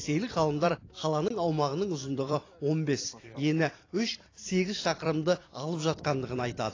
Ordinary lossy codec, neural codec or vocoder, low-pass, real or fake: AAC, 32 kbps; vocoder, 44.1 kHz, 128 mel bands every 256 samples, BigVGAN v2; 7.2 kHz; fake